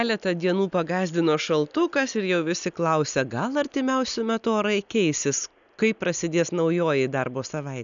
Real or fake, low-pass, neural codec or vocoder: real; 7.2 kHz; none